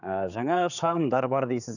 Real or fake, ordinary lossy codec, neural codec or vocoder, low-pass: fake; none; codec, 16 kHz, 4 kbps, X-Codec, HuBERT features, trained on general audio; 7.2 kHz